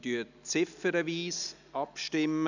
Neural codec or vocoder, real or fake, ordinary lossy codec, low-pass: none; real; none; 7.2 kHz